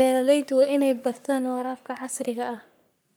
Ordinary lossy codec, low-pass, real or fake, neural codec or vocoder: none; none; fake; codec, 44.1 kHz, 3.4 kbps, Pupu-Codec